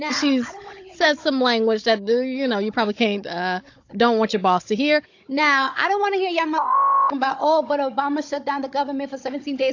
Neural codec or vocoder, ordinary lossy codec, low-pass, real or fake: codec, 16 kHz, 16 kbps, FunCodec, trained on Chinese and English, 50 frames a second; AAC, 48 kbps; 7.2 kHz; fake